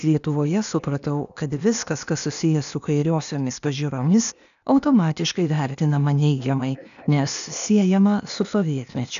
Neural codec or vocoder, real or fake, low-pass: codec, 16 kHz, 0.8 kbps, ZipCodec; fake; 7.2 kHz